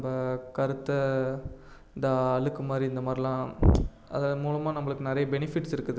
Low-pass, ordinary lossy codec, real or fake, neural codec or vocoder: none; none; real; none